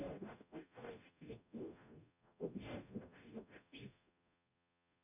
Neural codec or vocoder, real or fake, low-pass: codec, 44.1 kHz, 0.9 kbps, DAC; fake; 3.6 kHz